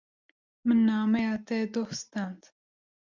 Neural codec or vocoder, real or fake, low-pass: none; real; 7.2 kHz